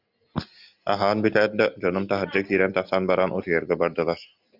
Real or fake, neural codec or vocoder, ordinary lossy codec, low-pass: real; none; Opus, 64 kbps; 5.4 kHz